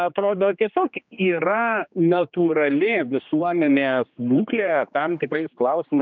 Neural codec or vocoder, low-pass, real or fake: codec, 16 kHz, 2 kbps, X-Codec, HuBERT features, trained on general audio; 7.2 kHz; fake